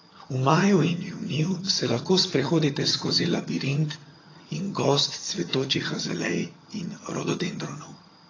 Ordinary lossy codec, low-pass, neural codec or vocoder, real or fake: AAC, 32 kbps; 7.2 kHz; vocoder, 22.05 kHz, 80 mel bands, HiFi-GAN; fake